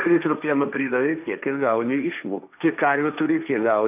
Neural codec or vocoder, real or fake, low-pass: codec, 16 kHz, 1.1 kbps, Voila-Tokenizer; fake; 3.6 kHz